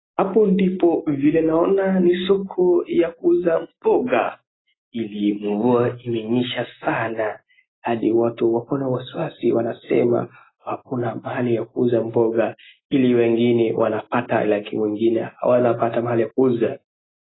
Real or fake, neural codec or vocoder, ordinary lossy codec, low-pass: real; none; AAC, 16 kbps; 7.2 kHz